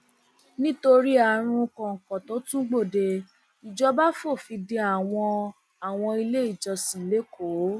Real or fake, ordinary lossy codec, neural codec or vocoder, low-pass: real; none; none; none